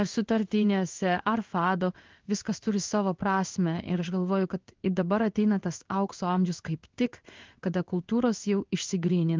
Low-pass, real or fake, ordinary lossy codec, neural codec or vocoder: 7.2 kHz; fake; Opus, 24 kbps; codec, 16 kHz in and 24 kHz out, 1 kbps, XY-Tokenizer